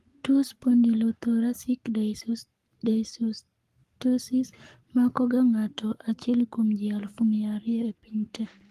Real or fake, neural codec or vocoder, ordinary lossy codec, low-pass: fake; codec, 44.1 kHz, 7.8 kbps, Pupu-Codec; Opus, 24 kbps; 14.4 kHz